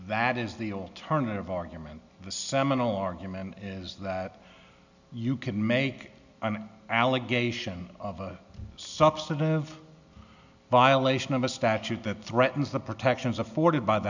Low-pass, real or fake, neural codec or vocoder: 7.2 kHz; real; none